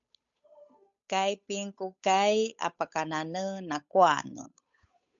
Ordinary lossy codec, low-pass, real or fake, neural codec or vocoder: MP3, 96 kbps; 7.2 kHz; fake; codec, 16 kHz, 8 kbps, FunCodec, trained on Chinese and English, 25 frames a second